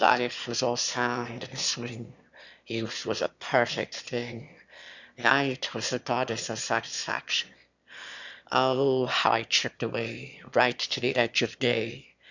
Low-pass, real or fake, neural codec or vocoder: 7.2 kHz; fake; autoencoder, 22.05 kHz, a latent of 192 numbers a frame, VITS, trained on one speaker